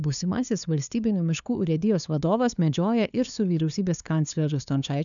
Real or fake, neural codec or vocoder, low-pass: fake; codec, 16 kHz, 2 kbps, FunCodec, trained on LibriTTS, 25 frames a second; 7.2 kHz